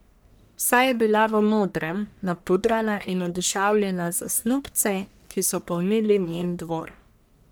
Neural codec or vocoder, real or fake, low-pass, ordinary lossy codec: codec, 44.1 kHz, 1.7 kbps, Pupu-Codec; fake; none; none